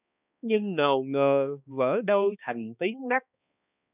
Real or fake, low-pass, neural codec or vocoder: fake; 3.6 kHz; codec, 16 kHz, 2 kbps, X-Codec, HuBERT features, trained on balanced general audio